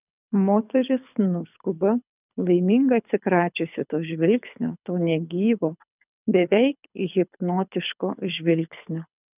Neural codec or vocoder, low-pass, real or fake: codec, 24 kHz, 6 kbps, HILCodec; 3.6 kHz; fake